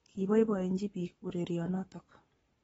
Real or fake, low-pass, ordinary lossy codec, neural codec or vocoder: fake; 19.8 kHz; AAC, 24 kbps; codec, 44.1 kHz, 7.8 kbps, Pupu-Codec